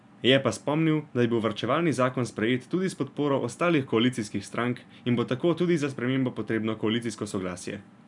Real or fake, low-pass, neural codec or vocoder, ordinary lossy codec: real; 10.8 kHz; none; none